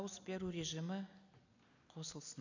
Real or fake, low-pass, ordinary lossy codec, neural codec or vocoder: real; 7.2 kHz; none; none